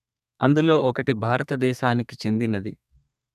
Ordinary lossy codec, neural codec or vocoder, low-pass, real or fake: none; codec, 32 kHz, 1.9 kbps, SNAC; 14.4 kHz; fake